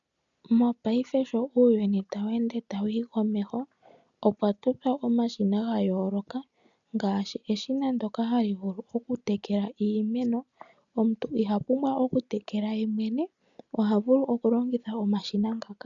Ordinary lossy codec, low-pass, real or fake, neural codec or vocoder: MP3, 96 kbps; 7.2 kHz; real; none